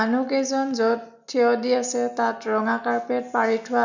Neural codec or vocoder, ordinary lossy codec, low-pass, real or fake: none; none; 7.2 kHz; real